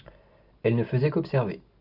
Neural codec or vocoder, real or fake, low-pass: none; real; 5.4 kHz